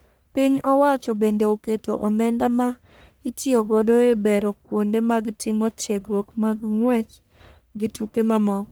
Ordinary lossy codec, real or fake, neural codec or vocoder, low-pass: none; fake; codec, 44.1 kHz, 1.7 kbps, Pupu-Codec; none